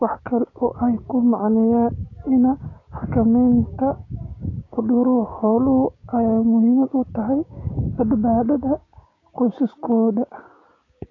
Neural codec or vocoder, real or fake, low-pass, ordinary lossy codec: codec, 16 kHz, 6 kbps, DAC; fake; 7.2 kHz; AAC, 32 kbps